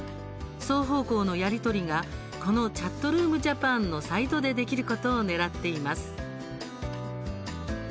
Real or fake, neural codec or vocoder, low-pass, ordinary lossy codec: real; none; none; none